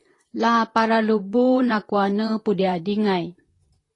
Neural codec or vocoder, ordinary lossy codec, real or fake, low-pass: vocoder, 44.1 kHz, 128 mel bands, Pupu-Vocoder; AAC, 32 kbps; fake; 10.8 kHz